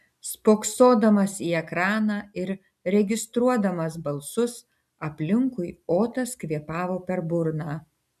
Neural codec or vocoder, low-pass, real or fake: none; 14.4 kHz; real